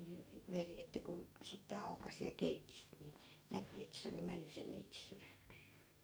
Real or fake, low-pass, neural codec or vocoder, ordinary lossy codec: fake; none; codec, 44.1 kHz, 2.6 kbps, DAC; none